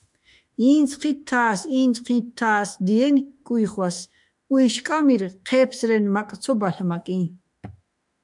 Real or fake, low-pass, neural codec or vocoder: fake; 10.8 kHz; autoencoder, 48 kHz, 32 numbers a frame, DAC-VAE, trained on Japanese speech